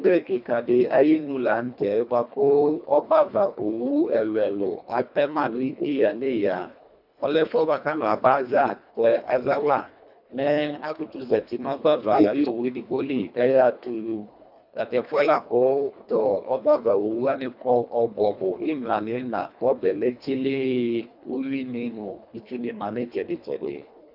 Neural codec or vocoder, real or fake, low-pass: codec, 24 kHz, 1.5 kbps, HILCodec; fake; 5.4 kHz